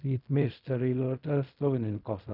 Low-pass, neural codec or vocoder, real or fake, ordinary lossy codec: 5.4 kHz; codec, 16 kHz in and 24 kHz out, 0.4 kbps, LongCat-Audio-Codec, fine tuned four codebook decoder; fake; none